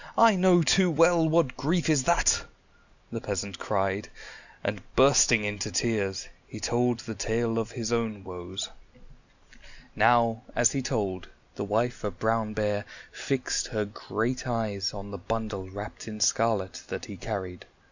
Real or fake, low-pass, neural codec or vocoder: real; 7.2 kHz; none